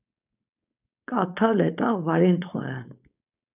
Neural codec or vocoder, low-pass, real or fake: codec, 16 kHz, 4.8 kbps, FACodec; 3.6 kHz; fake